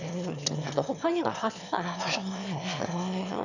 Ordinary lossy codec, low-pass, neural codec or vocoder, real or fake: none; 7.2 kHz; autoencoder, 22.05 kHz, a latent of 192 numbers a frame, VITS, trained on one speaker; fake